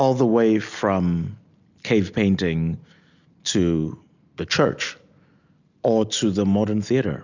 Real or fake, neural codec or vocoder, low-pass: real; none; 7.2 kHz